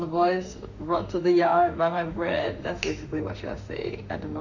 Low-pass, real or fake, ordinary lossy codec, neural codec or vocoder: 7.2 kHz; fake; none; autoencoder, 48 kHz, 32 numbers a frame, DAC-VAE, trained on Japanese speech